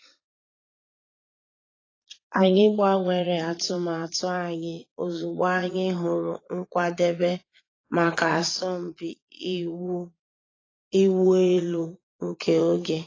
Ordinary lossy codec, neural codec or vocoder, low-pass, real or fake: AAC, 32 kbps; vocoder, 22.05 kHz, 80 mel bands, Vocos; 7.2 kHz; fake